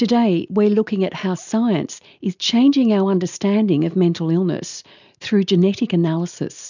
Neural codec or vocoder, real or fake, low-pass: none; real; 7.2 kHz